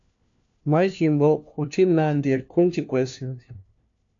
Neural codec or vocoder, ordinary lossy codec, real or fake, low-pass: codec, 16 kHz, 1 kbps, FunCodec, trained on LibriTTS, 50 frames a second; AAC, 64 kbps; fake; 7.2 kHz